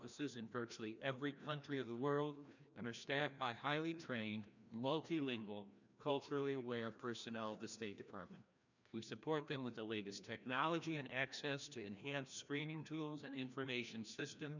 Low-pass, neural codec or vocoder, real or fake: 7.2 kHz; codec, 16 kHz, 1 kbps, FreqCodec, larger model; fake